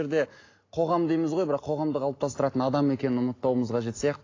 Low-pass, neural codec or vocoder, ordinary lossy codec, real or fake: 7.2 kHz; none; AAC, 32 kbps; real